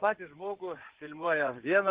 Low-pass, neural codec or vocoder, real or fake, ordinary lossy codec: 3.6 kHz; codec, 16 kHz in and 24 kHz out, 2.2 kbps, FireRedTTS-2 codec; fake; Opus, 16 kbps